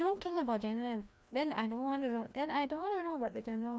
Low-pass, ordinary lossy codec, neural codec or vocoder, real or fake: none; none; codec, 16 kHz, 1 kbps, FreqCodec, larger model; fake